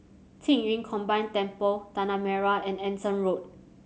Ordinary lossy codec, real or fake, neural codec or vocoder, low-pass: none; real; none; none